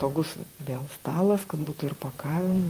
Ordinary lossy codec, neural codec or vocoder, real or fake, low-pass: Opus, 32 kbps; vocoder, 44.1 kHz, 128 mel bands, Pupu-Vocoder; fake; 14.4 kHz